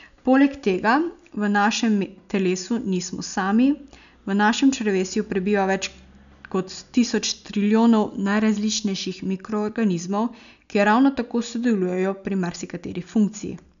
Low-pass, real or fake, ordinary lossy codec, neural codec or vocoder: 7.2 kHz; real; none; none